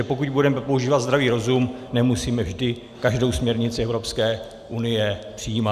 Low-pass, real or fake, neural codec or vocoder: 14.4 kHz; real; none